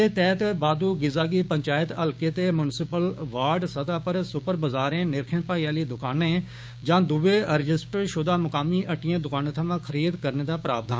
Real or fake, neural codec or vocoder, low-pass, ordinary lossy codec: fake; codec, 16 kHz, 6 kbps, DAC; none; none